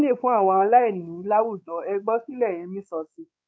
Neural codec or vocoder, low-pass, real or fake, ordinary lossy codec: codec, 16 kHz, 4 kbps, X-Codec, WavLM features, trained on Multilingual LibriSpeech; none; fake; none